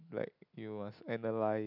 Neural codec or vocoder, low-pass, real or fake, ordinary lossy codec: none; 5.4 kHz; real; none